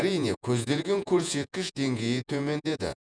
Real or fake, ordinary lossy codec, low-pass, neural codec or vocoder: fake; none; 9.9 kHz; vocoder, 48 kHz, 128 mel bands, Vocos